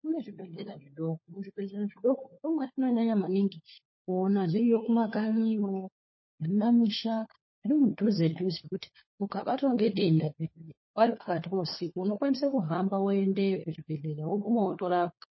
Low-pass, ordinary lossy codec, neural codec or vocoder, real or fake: 7.2 kHz; MP3, 24 kbps; codec, 16 kHz, 4 kbps, FunCodec, trained on LibriTTS, 50 frames a second; fake